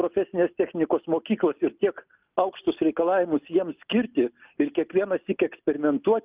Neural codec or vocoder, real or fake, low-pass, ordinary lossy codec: none; real; 3.6 kHz; Opus, 32 kbps